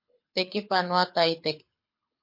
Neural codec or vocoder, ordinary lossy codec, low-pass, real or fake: codec, 24 kHz, 6 kbps, HILCodec; MP3, 32 kbps; 5.4 kHz; fake